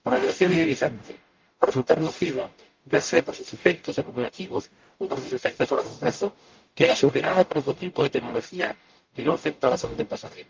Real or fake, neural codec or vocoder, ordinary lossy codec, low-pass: fake; codec, 44.1 kHz, 0.9 kbps, DAC; Opus, 24 kbps; 7.2 kHz